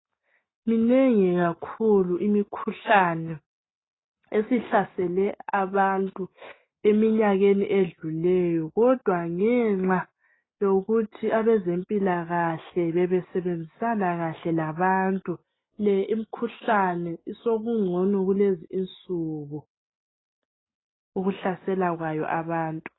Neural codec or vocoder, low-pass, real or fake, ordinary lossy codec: none; 7.2 kHz; real; AAC, 16 kbps